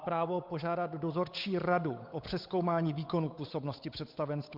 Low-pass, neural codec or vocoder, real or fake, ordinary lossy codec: 5.4 kHz; codec, 16 kHz, 8 kbps, FunCodec, trained on Chinese and English, 25 frames a second; fake; MP3, 48 kbps